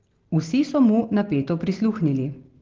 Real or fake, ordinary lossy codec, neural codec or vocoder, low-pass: real; Opus, 16 kbps; none; 7.2 kHz